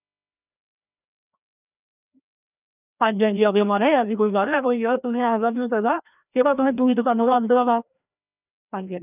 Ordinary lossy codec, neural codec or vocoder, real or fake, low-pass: none; codec, 16 kHz, 1 kbps, FreqCodec, larger model; fake; 3.6 kHz